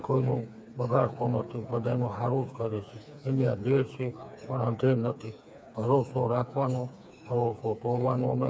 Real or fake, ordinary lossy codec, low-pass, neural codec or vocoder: fake; none; none; codec, 16 kHz, 4 kbps, FreqCodec, smaller model